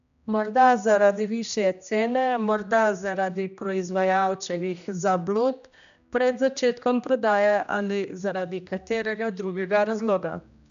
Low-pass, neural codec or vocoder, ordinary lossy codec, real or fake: 7.2 kHz; codec, 16 kHz, 1 kbps, X-Codec, HuBERT features, trained on general audio; none; fake